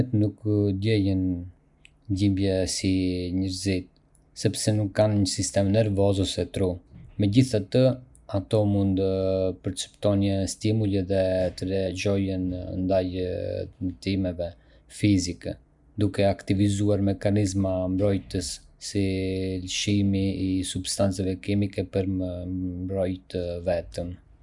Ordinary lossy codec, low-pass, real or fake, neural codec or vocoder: none; 10.8 kHz; real; none